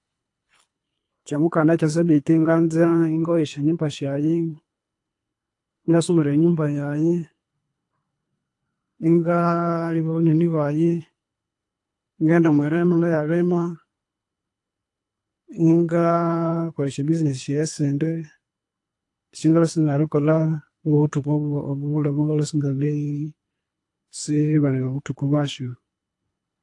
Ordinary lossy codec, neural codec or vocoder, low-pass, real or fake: AAC, 48 kbps; codec, 24 kHz, 3 kbps, HILCodec; 10.8 kHz; fake